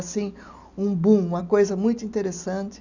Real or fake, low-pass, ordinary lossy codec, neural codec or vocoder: real; 7.2 kHz; none; none